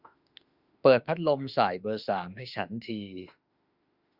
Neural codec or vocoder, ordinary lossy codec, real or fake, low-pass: autoencoder, 48 kHz, 32 numbers a frame, DAC-VAE, trained on Japanese speech; Opus, 64 kbps; fake; 5.4 kHz